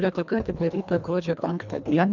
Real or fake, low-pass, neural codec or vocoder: fake; 7.2 kHz; codec, 24 kHz, 1.5 kbps, HILCodec